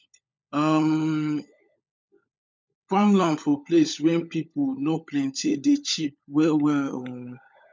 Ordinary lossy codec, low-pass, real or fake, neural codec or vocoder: none; none; fake; codec, 16 kHz, 16 kbps, FunCodec, trained on LibriTTS, 50 frames a second